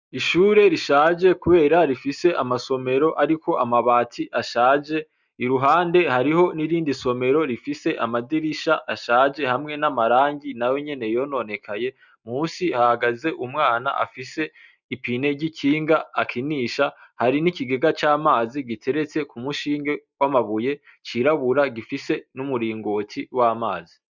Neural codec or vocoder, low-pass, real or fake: none; 7.2 kHz; real